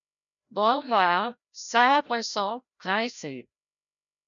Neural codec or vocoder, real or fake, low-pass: codec, 16 kHz, 0.5 kbps, FreqCodec, larger model; fake; 7.2 kHz